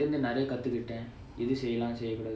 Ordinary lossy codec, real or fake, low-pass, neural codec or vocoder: none; real; none; none